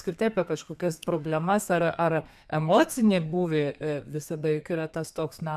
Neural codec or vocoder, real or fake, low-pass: codec, 32 kHz, 1.9 kbps, SNAC; fake; 14.4 kHz